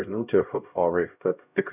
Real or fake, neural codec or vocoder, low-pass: fake; codec, 16 kHz, 0.5 kbps, X-Codec, HuBERT features, trained on LibriSpeech; 3.6 kHz